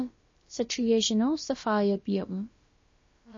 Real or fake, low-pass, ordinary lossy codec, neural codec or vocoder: fake; 7.2 kHz; MP3, 32 kbps; codec, 16 kHz, about 1 kbps, DyCAST, with the encoder's durations